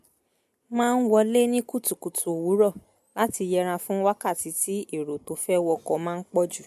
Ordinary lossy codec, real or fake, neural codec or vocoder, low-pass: MP3, 64 kbps; real; none; 14.4 kHz